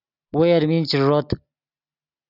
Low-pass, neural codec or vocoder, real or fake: 5.4 kHz; none; real